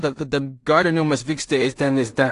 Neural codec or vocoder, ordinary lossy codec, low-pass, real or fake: codec, 16 kHz in and 24 kHz out, 0.4 kbps, LongCat-Audio-Codec, two codebook decoder; AAC, 48 kbps; 10.8 kHz; fake